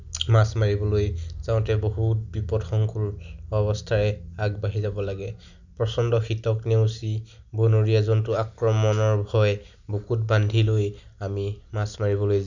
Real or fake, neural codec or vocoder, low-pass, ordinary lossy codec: real; none; 7.2 kHz; none